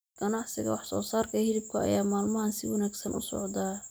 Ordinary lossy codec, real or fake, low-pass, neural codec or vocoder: none; real; none; none